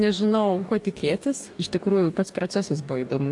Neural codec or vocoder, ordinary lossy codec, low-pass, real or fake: codec, 44.1 kHz, 2.6 kbps, DAC; AAC, 64 kbps; 10.8 kHz; fake